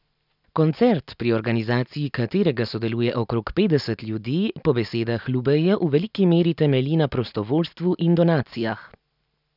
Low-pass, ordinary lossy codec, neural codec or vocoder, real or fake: 5.4 kHz; none; none; real